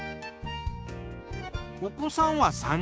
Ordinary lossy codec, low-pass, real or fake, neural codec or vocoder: none; none; fake; codec, 16 kHz, 6 kbps, DAC